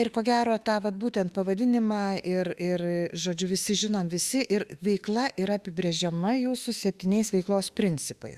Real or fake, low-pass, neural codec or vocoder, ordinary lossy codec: fake; 14.4 kHz; autoencoder, 48 kHz, 32 numbers a frame, DAC-VAE, trained on Japanese speech; Opus, 64 kbps